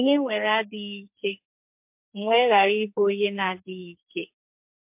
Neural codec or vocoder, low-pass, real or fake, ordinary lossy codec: codec, 44.1 kHz, 2.6 kbps, SNAC; 3.6 kHz; fake; MP3, 32 kbps